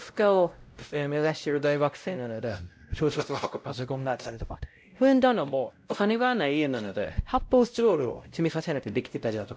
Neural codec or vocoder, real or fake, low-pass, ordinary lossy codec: codec, 16 kHz, 0.5 kbps, X-Codec, WavLM features, trained on Multilingual LibriSpeech; fake; none; none